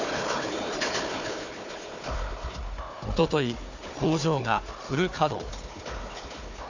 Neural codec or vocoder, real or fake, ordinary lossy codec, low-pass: codec, 16 kHz, 4 kbps, FunCodec, trained on Chinese and English, 50 frames a second; fake; none; 7.2 kHz